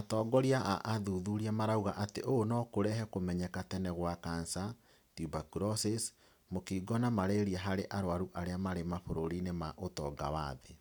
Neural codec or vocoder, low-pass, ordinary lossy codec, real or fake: none; none; none; real